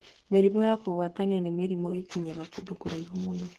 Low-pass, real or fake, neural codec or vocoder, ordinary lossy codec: 14.4 kHz; fake; codec, 32 kHz, 1.9 kbps, SNAC; Opus, 16 kbps